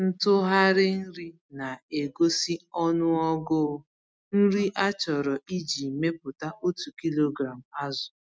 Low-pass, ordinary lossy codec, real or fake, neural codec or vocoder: none; none; real; none